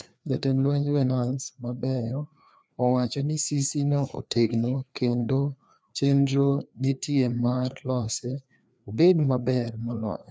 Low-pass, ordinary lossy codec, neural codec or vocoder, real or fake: none; none; codec, 16 kHz, 2 kbps, FreqCodec, larger model; fake